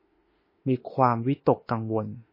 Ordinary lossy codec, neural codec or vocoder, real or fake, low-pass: MP3, 24 kbps; autoencoder, 48 kHz, 32 numbers a frame, DAC-VAE, trained on Japanese speech; fake; 5.4 kHz